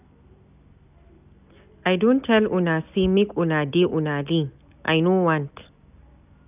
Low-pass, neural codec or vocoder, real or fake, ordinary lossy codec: 3.6 kHz; none; real; none